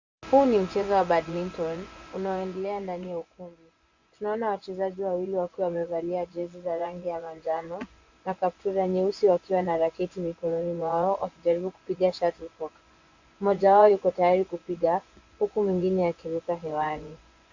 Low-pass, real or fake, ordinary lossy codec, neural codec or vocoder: 7.2 kHz; fake; Opus, 64 kbps; vocoder, 24 kHz, 100 mel bands, Vocos